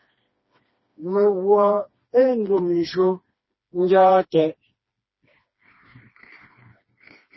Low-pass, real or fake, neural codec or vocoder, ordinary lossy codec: 7.2 kHz; fake; codec, 16 kHz, 2 kbps, FreqCodec, smaller model; MP3, 24 kbps